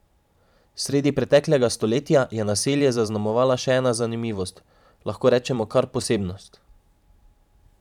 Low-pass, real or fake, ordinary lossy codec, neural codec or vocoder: 19.8 kHz; fake; none; vocoder, 44.1 kHz, 128 mel bands every 512 samples, BigVGAN v2